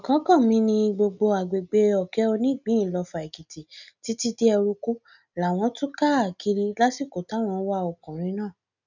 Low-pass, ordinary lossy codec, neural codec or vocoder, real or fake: 7.2 kHz; none; none; real